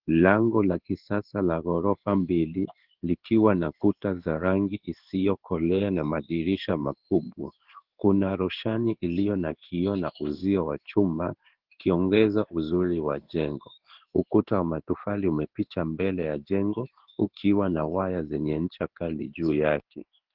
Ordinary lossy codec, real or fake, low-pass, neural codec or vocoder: Opus, 16 kbps; fake; 5.4 kHz; codec, 16 kHz in and 24 kHz out, 1 kbps, XY-Tokenizer